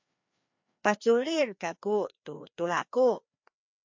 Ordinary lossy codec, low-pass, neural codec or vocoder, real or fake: MP3, 48 kbps; 7.2 kHz; codec, 16 kHz, 2 kbps, FreqCodec, larger model; fake